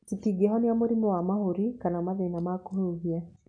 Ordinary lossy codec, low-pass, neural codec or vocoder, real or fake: MP3, 64 kbps; 9.9 kHz; none; real